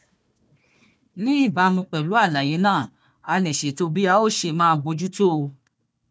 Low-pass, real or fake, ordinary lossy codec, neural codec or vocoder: none; fake; none; codec, 16 kHz, 1 kbps, FunCodec, trained on Chinese and English, 50 frames a second